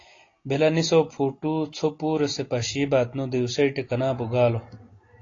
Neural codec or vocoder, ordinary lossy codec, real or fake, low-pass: none; AAC, 32 kbps; real; 7.2 kHz